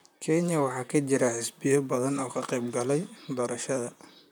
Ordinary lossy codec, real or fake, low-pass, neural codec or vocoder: none; fake; none; vocoder, 44.1 kHz, 128 mel bands, Pupu-Vocoder